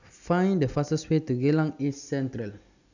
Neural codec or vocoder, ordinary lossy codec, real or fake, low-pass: none; none; real; 7.2 kHz